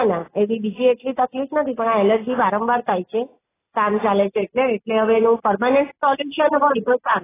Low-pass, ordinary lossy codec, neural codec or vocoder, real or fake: 3.6 kHz; AAC, 16 kbps; none; real